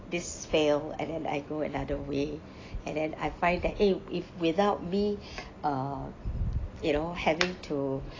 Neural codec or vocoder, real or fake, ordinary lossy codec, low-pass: none; real; AAC, 32 kbps; 7.2 kHz